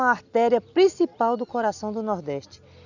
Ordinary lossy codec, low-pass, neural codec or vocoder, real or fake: none; 7.2 kHz; none; real